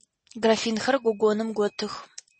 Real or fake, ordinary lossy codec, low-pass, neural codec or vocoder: real; MP3, 32 kbps; 10.8 kHz; none